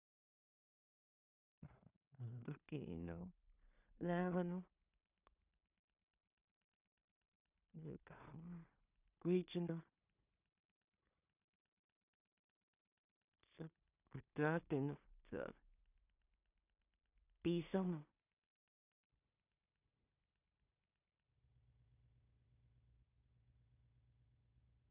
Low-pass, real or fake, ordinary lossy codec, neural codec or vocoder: 3.6 kHz; fake; none; codec, 16 kHz in and 24 kHz out, 0.4 kbps, LongCat-Audio-Codec, two codebook decoder